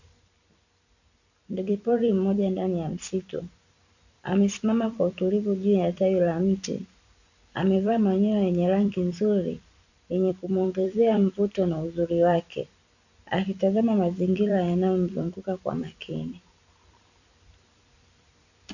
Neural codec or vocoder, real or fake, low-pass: vocoder, 44.1 kHz, 128 mel bands every 256 samples, BigVGAN v2; fake; 7.2 kHz